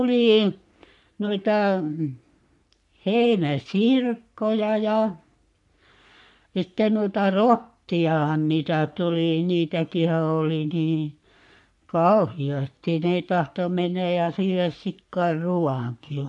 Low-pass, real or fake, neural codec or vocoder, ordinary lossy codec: 10.8 kHz; fake; codec, 44.1 kHz, 3.4 kbps, Pupu-Codec; none